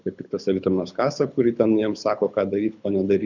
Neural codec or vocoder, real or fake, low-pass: codec, 24 kHz, 6 kbps, HILCodec; fake; 7.2 kHz